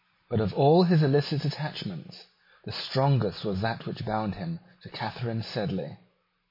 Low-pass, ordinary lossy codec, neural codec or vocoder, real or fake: 5.4 kHz; MP3, 24 kbps; none; real